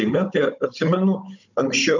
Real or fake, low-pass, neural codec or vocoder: fake; 7.2 kHz; codec, 16 kHz, 16 kbps, FunCodec, trained on Chinese and English, 50 frames a second